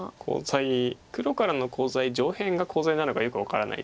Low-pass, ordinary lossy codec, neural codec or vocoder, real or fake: none; none; none; real